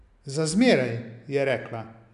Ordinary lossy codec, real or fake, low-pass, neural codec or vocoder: none; real; 10.8 kHz; none